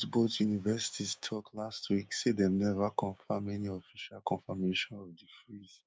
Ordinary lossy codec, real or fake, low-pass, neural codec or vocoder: none; fake; none; codec, 16 kHz, 6 kbps, DAC